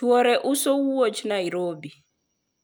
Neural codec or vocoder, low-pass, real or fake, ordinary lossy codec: none; none; real; none